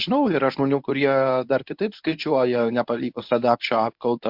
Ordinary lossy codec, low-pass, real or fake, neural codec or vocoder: MP3, 48 kbps; 5.4 kHz; fake; codec, 24 kHz, 0.9 kbps, WavTokenizer, medium speech release version 1